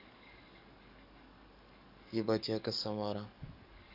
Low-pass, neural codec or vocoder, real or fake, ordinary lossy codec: 5.4 kHz; codec, 44.1 kHz, 7.8 kbps, DAC; fake; none